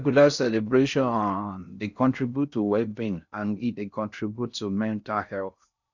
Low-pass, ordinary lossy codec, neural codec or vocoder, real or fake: 7.2 kHz; none; codec, 16 kHz in and 24 kHz out, 0.6 kbps, FocalCodec, streaming, 4096 codes; fake